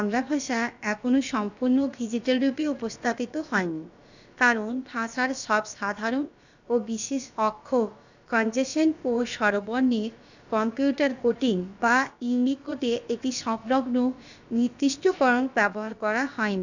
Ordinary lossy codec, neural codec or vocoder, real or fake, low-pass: none; codec, 16 kHz, about 1 kbps, DyCAST, with the encoder's durations; fake; 7.2 kHz